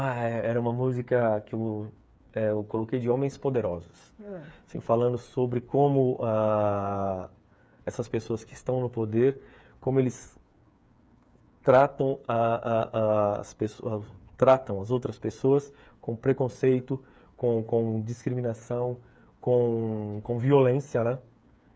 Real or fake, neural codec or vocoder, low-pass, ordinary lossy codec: fake; codec, 16 kHz, 8 kbps, FreqCodec, smaller model; none; none